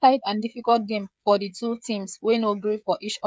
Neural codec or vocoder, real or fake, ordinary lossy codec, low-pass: codec, 16 kHz, 16 kbps, FreqCodec, smaller model; fake; none; none